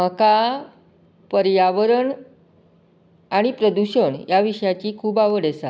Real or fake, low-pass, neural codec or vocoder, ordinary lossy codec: real; none; none; none